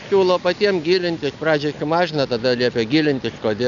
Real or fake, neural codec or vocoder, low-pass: real; none; 7.2 kHz